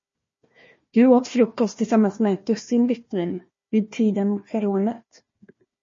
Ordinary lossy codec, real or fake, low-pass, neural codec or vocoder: MP3, 32 kbps; fake; 7.2 kHz; codec, 16 kHz, 1 kbps, FunCodec, trained on Chinese and English, 50 frames a second